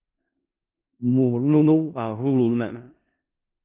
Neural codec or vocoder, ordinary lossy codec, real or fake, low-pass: codec, 16 kHz in and 24 kHz out, 0.4 kbps, LongCat-Audio-Codec, four codebook decoder; Opus, 32 kbps; fake; 3.6 kHz